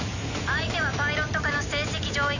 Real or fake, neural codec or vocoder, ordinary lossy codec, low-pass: real; none; none; 7.2 kHz